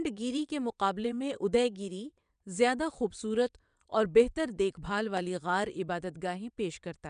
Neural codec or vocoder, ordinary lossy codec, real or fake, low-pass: vocoder, 22.05 kHz, 80 mel bands, WaveNeXt; none; fake; 9.9 kHz